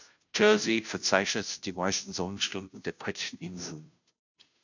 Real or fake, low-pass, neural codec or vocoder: fake; 7.2 kHz; codec, 16 kHz, 0.5 kbps, FunCodec, trained on Chinese and English, 25 frames a second